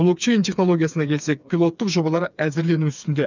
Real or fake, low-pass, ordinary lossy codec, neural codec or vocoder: fake; 7.2 kHz; none; codec, 16 kHz, 4 kbps, FreqCodec, smaller model